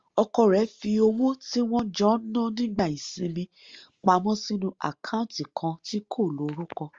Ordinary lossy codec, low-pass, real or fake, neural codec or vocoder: Opus, 32 kbps; 7.2 kHz; real; none